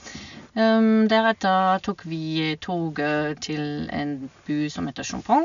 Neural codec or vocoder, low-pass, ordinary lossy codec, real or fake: none; 7.2 kHz; none; real